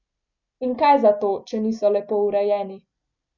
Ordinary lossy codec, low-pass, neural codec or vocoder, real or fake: none; 7.2 kHz; none; real